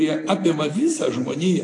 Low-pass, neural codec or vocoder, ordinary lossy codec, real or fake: 10.8 kHz; none; AAC, 48 kbps; real